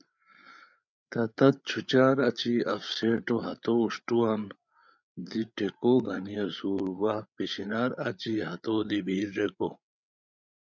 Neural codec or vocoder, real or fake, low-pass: codec, 16 kHz, 8 kbps, FreqCodec, larger model; fake; 7.2 kHz